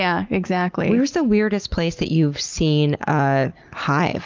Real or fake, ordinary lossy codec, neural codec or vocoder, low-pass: real; Opus, 32 kbps; none; 7.2 kHz